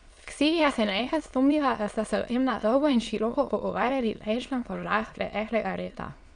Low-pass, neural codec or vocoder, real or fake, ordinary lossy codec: 9.9 kHz; autoencoder, 22.05 kHz, a latent of 192 numbers a frame, VITS, trained on many speakers; fake; none